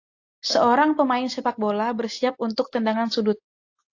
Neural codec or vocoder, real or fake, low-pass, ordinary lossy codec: none; real; 7.2 kHz; AAC, 48 kbps